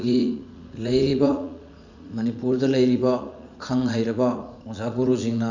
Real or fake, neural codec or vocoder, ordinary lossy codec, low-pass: fake; vocoder, 44.1 kHz, 80 mel bands, Vocos; none; 7.2 kHz